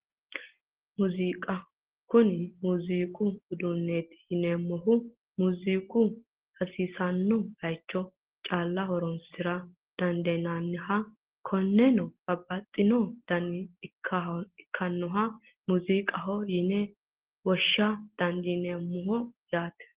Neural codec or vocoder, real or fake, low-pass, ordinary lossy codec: none; real; 3.6 kHz; Opus, 16 kbps